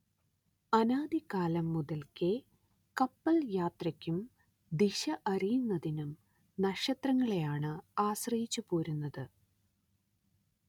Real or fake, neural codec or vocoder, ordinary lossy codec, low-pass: real; none; none; 19.8 kHz